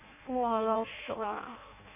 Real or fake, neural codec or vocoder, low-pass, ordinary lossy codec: fake; codec, 16 kHz in and 24 kHz out, 0.6 kbps, FireRedTTS-2 codec; 3.6 kHz; none